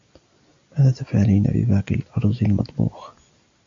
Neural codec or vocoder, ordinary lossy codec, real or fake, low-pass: none; AAC, 64 kbps; real; 7.2 kHz